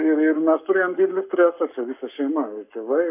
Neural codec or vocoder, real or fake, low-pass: codec, 44.1 kHz, 7.8 kbps, Pupu-Codec; fake; 3.6 kHz